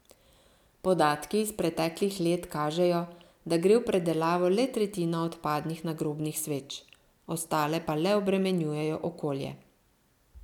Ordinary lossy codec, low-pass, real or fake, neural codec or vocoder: none; 19.8 kHz; real; none